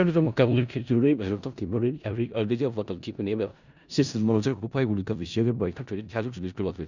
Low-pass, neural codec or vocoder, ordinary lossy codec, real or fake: 7.2 kHz; codec, 16 kHz in and 24 kHz out, 0.4 kbps, LongCat-Audio-Codec, four codebook decoder; none; fake